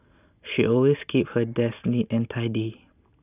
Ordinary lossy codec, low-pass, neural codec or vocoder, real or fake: none; 3.6 kHz; none; real